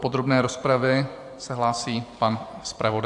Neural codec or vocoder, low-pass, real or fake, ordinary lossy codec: vocoder, 44.1 kHz, 128 mel bands every 512 samples, BigVGAN v2; 10.8 kHz; fake; AAC, 64 kbps